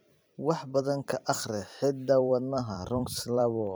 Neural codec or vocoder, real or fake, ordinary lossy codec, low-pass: vocoder, 44.1 kHz, 128 mel bands every 256 samples, BigVGAN v2; fake; none; none